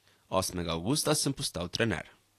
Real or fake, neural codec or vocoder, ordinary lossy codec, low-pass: real; none; AAC, 48 kbps; 14.4 kHz